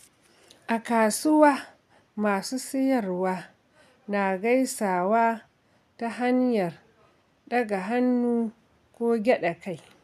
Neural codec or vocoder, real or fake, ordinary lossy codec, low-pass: none; real; none; 14.4 kHz